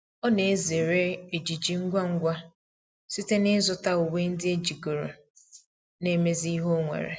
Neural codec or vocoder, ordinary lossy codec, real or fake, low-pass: none; none; real; none